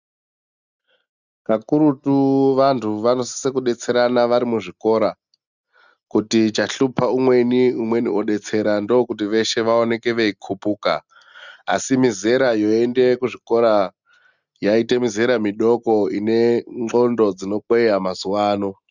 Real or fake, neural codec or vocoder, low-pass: real; none; 7.2 kHz